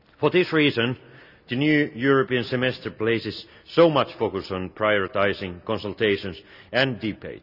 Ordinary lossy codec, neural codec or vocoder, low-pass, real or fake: none; none; 5.4 kHz; real